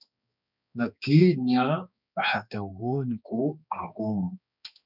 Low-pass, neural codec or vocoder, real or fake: 5.4 kHz; codec, 16 kHz, 2 kbps, X-Codec, HuBERT features, trained on balanced general audio; fake